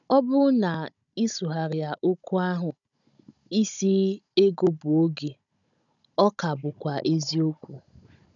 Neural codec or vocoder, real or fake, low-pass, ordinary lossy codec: codec, 16 kHz, 16 kbps, FunCodec, trained on Chinese and English, 50 frames a second; fake; 7.2 kHz; none